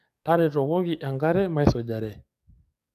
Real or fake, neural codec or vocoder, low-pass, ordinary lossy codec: fake; codec, 44.1 kHz, 7.8 kbps, DAC; 14.4 kHz; none